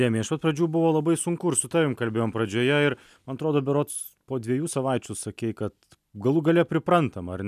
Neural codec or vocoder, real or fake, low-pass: none; real; 14.4 kHz